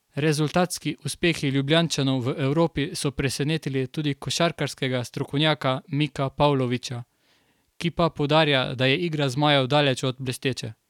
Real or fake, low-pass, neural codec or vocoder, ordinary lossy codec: real; 19.8 kHz; none; none